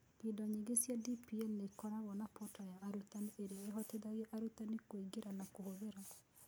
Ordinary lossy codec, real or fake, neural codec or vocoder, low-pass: none; real; none; none